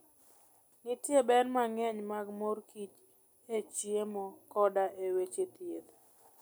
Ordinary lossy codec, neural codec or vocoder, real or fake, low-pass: none; none; real; none